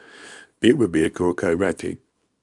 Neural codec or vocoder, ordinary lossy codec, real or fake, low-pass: codec, 24 kHz, 0.9 kbps, WavTokenizer, small release; MP3, 96 kbps; fake; 10.8 kHz